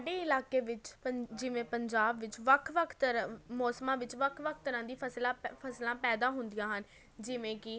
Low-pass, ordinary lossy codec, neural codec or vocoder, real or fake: none; none; none; real